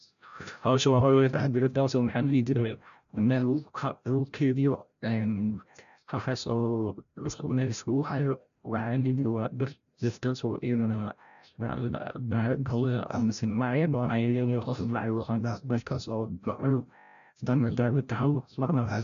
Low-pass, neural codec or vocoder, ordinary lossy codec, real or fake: 7.2 kHz; codec, 16 kHz, 0.5 kbps, FreqCodec, larger model; none; fake